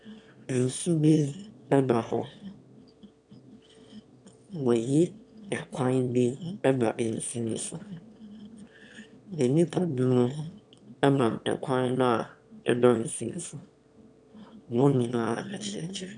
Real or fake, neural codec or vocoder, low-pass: fake; autoencoder, 22.05 kHz, a latent of 192 numbers a frame, VITS, trained on one speaker; 9.9 kHz